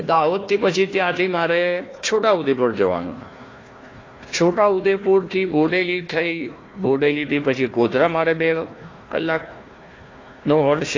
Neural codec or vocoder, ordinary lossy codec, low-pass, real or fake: codec, 16 kHz, 1 kbps, FunCodec, trained on Chinese and English, 50 frames a second; AAC, 32 kbps; 7.2 kHz; fake